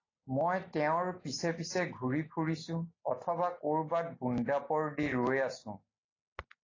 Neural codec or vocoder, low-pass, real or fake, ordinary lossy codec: none; 7.2 kHz; real; AAC, 32 kbps